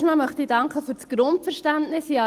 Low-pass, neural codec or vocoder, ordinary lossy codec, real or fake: 14.4 kHz; none; Opus, 24 kbps; real